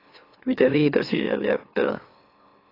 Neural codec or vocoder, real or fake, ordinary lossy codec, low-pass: autoencoder, 44.1 kHz, a latent of 192 numbers a frame, MeloTTS; fake; AAC, 24 kbps; 5.4 kHz